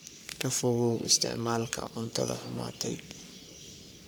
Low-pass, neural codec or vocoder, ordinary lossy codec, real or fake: none; codec, 44.1 kHz, 3.4 kbps, Pupu-Codec; none; fake